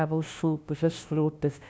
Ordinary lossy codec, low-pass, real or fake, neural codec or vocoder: none; none; fake; codec, 16 kHz, 0.5 kbps, FunCodec, trained on LibriTTS, 25 frames a second